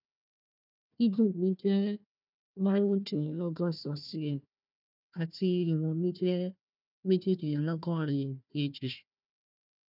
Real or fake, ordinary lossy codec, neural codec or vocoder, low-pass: fake; none; codec, 16 kHz, 1 kbps, FunCodec, trained on Chinese and English, 50 frames a second; 5.4 kHz